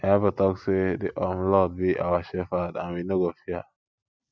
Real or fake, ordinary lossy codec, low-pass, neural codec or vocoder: real; none; none; none